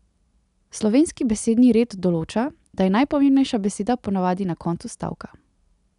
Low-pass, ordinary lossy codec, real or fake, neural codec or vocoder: 10.8 kHz; none; real; none